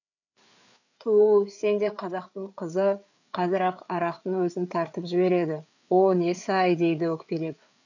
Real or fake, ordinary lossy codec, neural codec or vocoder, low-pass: fake; none; codec, 16 kHz, 4 kbps, FreqCodec, larger model; 7.2 kHz